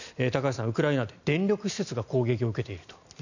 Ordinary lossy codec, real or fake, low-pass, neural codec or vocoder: none; real; 7.2 kHz; none